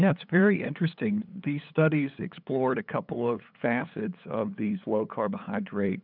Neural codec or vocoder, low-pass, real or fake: codec, 16 kHz, 8 kbps, FunCodec, trained on LibriTTS, 25 frames a second; 5.4 kHz; fake